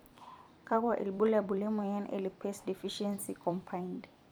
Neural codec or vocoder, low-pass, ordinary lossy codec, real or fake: vocoder, 44.1 kHz, 128 mel bands every 256 samples, BigVGAN v2; none; none; fake